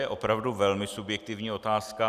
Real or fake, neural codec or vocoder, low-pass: real; none; 14.4 kHz